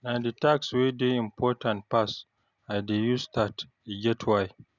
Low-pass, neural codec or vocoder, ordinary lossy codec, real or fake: 7.2 kHz; none; none; real